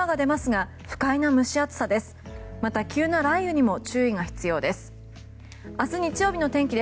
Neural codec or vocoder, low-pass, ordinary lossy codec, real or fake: none; none; none; real